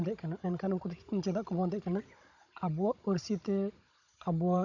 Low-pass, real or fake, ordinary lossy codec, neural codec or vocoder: 7.2 kHz; fake; none; codec, 16 kHz, 16 kbps, FunCodec, trained on Chinese and English, 50 frames a second